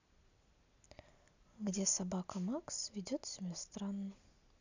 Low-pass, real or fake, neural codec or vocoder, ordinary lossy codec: 7.2 kHz; real; none; none